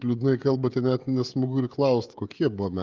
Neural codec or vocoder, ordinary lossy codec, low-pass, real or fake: codec, 16 kHz, 16 kbps, FreqCodec, smaller model; Opus, 32 kbps; 7.2 kHz; fake